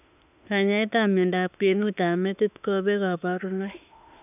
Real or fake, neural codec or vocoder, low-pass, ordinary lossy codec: fake; autoencoder, 48 kHz, 32 numbers a frame, DAC-VAE, trained on Japanese speech; 3.6 kHz; none